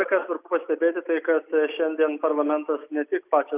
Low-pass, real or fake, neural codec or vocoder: 3.6 kHz; real; none